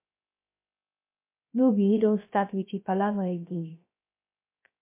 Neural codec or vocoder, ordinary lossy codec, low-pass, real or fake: codec, 16 kHz, 0.3 kbps, FocalCodec; MP3, 24 kbps; 3.6 kHz; fake